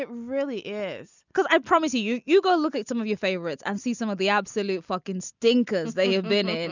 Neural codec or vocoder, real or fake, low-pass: none; real; 7.2 kHz